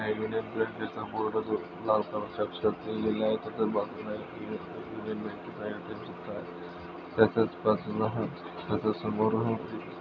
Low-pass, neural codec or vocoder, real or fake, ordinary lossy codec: 7.2 kHz; none; real; none